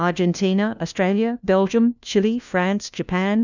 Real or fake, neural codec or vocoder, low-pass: fake; codec, 16 kHz, 1 kbps, FunCodec, trained on LibriTTS, 50 frames a second; 7.2 kHz